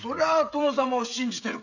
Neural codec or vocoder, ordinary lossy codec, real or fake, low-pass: codec, 16 kHz, 16 kbps, FreqCodec, smaller model; none; fake; 7.2 kHz